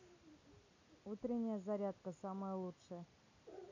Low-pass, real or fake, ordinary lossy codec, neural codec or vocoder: 7.2 kHz; real; none; none